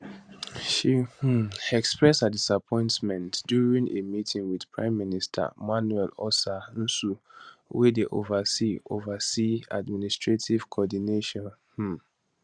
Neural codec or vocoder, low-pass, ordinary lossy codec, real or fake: vocoder, 44.1 kHz, 128 mel bands every 512 samples, BigVGAN v2; 9.9 kHz; Opus, 64 kbps; fake